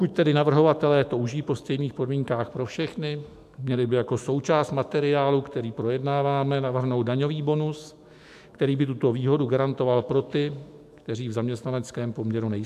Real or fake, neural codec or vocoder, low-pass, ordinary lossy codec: fake; autoencoder, 48 kHz, 128 numbers a frame, DAC-VAE, trained on Japanese speech; 14.4 kHz; AAC, 96 kbps